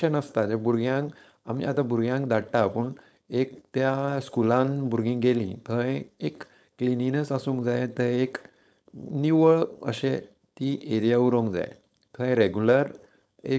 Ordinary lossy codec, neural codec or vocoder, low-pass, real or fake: none; codec, 16 kHz, 4.8 kbps, FACodec; none; fake